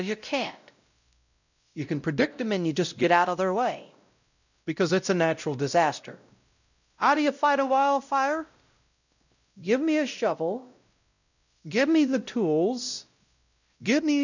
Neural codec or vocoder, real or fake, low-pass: codec, 16 kHz, 0.5 kbps, X-Codec, WavLM features, trained on Multilingual LibriSpeech; fake; 7.2 kHz